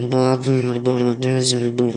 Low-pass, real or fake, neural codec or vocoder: 9.9 kHz; fake; autoencoder, 22.05 kHz, a latent of 192 numbers a frame, VITS, trained on one speaker